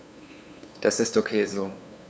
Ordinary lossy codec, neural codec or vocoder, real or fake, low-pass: none; codec, 16 kHz, 2 kbps, FunCodec, trained on LibriTTS, 25 frames a second; fake; none